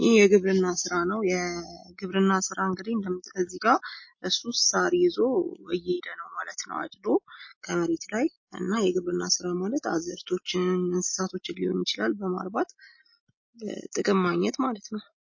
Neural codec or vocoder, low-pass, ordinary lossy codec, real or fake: none; 7.2 kHz; MP3, 32 kbps; real